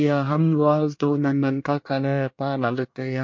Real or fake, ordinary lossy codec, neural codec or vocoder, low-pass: fake; MP3, 48 kbps; codec, 24 kHz, 1 kbps, SNAC; 7.2 kHz